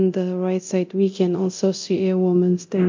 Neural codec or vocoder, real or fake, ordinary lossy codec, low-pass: codec, 24 kHz, 0.9 kbps, DualCodec; fake; MP3, 48 kbps; 7.2 kHz